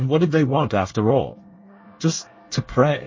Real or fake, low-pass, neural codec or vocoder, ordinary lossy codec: fake; 7.2 kHz; codec, 24 kHz, 1 kbps, SNAC; MP3, 32 kbps